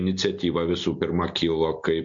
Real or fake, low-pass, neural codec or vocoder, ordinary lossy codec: real; 7.2 kHz; none; MP3, 48 kbps